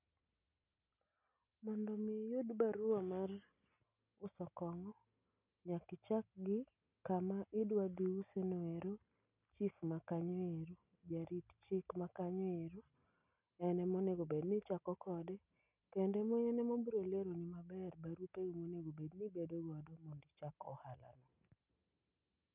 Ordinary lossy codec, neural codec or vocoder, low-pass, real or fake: none; none; 3.6 kHz; real